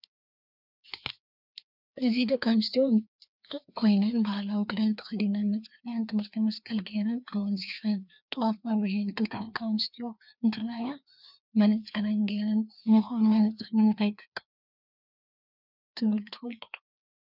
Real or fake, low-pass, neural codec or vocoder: fake; 5.4 kHz; codec, 16 kHz, 2 kbps, FreqCodec, larger model